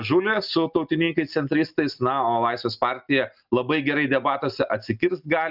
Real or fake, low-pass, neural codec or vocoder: real; 5.4 kHz; none